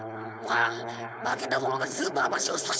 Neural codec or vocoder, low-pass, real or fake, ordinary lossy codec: codec, 16 kHz, 4.8 kbps, FACodec; none; fake; none